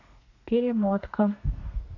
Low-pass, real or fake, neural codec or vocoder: 7.2 kHz; fake; codec, 32 kHz, 1.9 kbps, SNAC